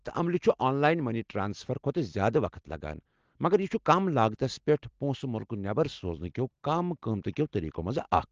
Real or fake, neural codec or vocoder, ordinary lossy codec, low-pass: real; none; Opus, 16 kbps; 7.2 kHz